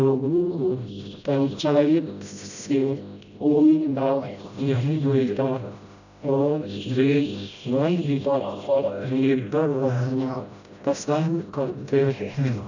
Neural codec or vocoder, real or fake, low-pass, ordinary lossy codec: codec, 16 kHz, 0.5 kbps, FreqCodec, smaller model; fake; 7.2 kHz; none